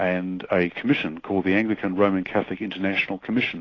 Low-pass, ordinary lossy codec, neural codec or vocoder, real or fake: 7.2 kHz; AAC, 32 kbps; none; real